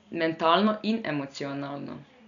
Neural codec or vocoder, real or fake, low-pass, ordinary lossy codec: none; real; 7.2 kHz; none